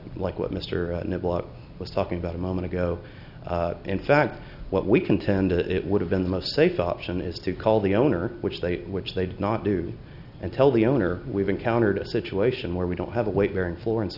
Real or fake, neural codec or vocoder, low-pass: real; none; 5.4 kHz